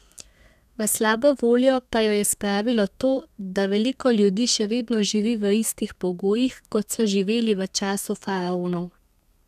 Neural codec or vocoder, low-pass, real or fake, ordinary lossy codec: codec, 32 kHz, 1.9 kbps, SNAC; 14.4 kHz; fake; none